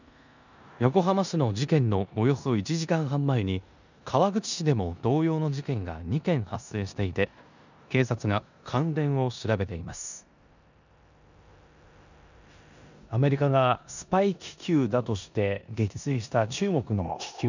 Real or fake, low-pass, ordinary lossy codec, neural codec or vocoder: fake; 7.2 kHz; none; codec, 16 kHz in and 24 kHz out, 0.9 kbps, LongCat-Audio-Codec, four codebook decoder